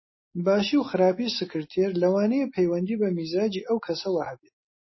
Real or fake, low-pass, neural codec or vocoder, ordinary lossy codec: real; 7.2 kHz; none; MP3, 24 kbps